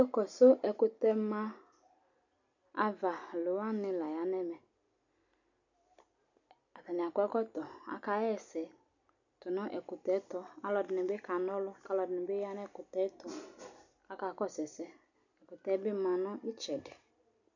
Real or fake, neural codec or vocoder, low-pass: real; none; 7.2 kHz